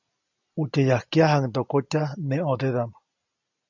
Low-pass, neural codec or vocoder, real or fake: 7.2 kHz; none; real